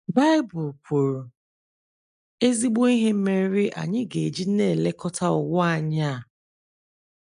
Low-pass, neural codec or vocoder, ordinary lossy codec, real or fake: 10.8 kHz; none; none; real